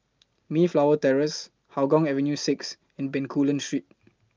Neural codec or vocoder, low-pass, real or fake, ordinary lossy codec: none; 7.2 kHz; real; Opus, 32 kbps